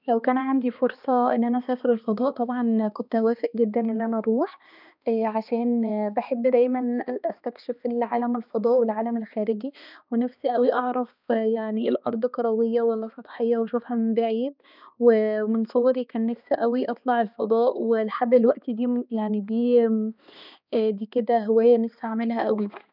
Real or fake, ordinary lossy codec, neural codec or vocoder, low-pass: fake; none; codec, 16 kHz, 2 kbps, X-Codec, HuBERT features, trained on balanced general audio; 5.4 kHz